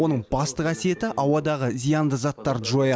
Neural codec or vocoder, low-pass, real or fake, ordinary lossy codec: none; none; real; none